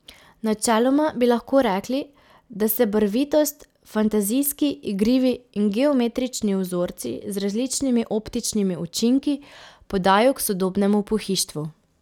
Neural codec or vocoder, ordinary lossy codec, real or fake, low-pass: none; none; real; 19.8 kHz